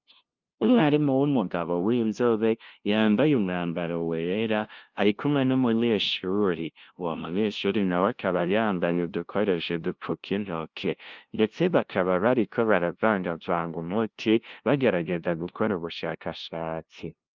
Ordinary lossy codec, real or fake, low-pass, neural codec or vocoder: Opus, 24 kbps; fake; 7.2 kHz; codec, 16 kHz, 0.5 kbps, FunCodec, trained on LibriTTS, 25 frames a second